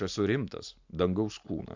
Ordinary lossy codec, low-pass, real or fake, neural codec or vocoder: MP3, 64 kbps; 7.2 kHz; real; none